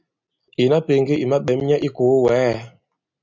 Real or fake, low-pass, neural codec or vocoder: real; 7.2 kHz; none